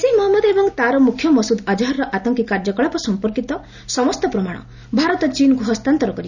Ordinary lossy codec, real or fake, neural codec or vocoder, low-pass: none; real; none; 7.2 kHz